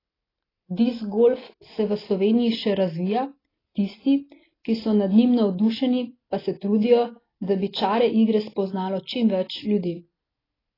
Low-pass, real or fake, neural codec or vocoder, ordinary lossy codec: 5.4 kHz; real; none; AAC, 24 kbps